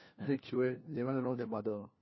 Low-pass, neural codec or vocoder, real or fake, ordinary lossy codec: 7.2 kHz; codec, 16 kHz, 1 kbps, FunCodec, trained on LibriTTS, 50 frames a second; fake; MP3, 24 kbps